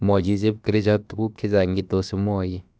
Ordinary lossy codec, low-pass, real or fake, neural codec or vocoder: none; none; fake; codec, 16 kHz, about 1 kbps, DyCAST, with the encoder's durations